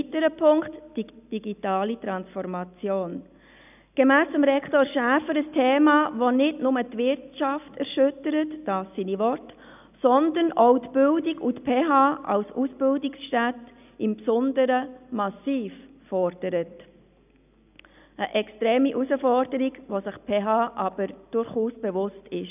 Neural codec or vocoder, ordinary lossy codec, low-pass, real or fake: none; none; 3.6 kHz; real